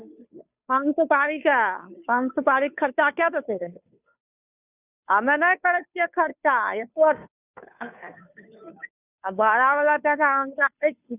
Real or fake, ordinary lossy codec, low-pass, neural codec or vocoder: fake; none; 3.6 kHz; codec, 16 kHz, 2 kbps, FunCodec, trained on Chinese and English, 25 frames a second